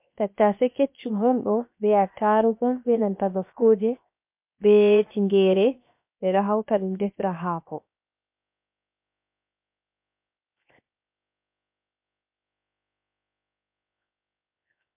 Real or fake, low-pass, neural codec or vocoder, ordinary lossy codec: fake; 3.6 kHz; codec, 16 kHz, 0.7 kbps, FocalCodec; MP3, 32 kbps